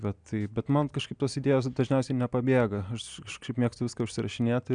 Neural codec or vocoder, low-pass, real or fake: none; 9.9 kHz; real